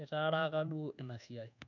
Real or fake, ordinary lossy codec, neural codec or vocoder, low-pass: fake; none; codec, 24 kHz, 1.2 kbps, DualCodec; 7.2 kHz